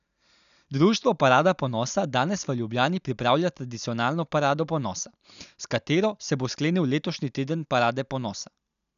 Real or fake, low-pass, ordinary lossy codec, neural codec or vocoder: real; 7.2 kHz; none; none